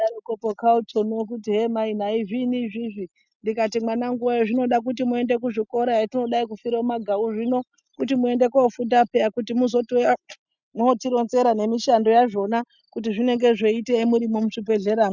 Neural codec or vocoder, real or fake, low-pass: none; real; 7.2 kHz